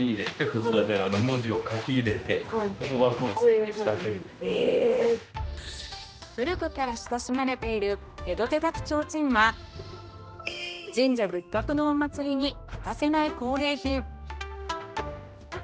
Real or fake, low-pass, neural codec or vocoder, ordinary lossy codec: fake; none; codec, 16 kHz, 1 kbps, X-Codec, HuBERT features, trained on general audio; none